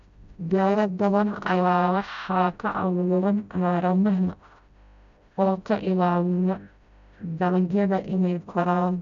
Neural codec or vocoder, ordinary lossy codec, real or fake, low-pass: codec, 16 kHz, 0.5 kbps, FreqCodec, smaller model; none; fake; 7.2 kHz